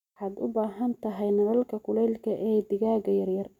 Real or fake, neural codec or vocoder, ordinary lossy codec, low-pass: real; none; none; 19.8 kHz